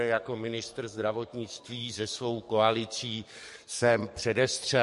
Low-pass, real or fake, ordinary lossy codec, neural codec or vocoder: 14.4 kHz; fake; MP3, 48 kbps; codec, 44.1 kHz, 7.8 kbps, DAC